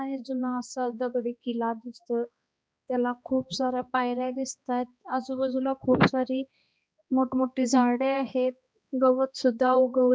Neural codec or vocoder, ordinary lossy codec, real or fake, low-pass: codec, 16 kHz, 2 kbps, X-Codec, HuBERT features, trained on balanced general audio; none; fake; none